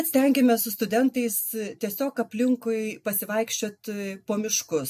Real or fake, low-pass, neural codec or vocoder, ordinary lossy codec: real; 14.4 kHz; none; MP3, 64 kbps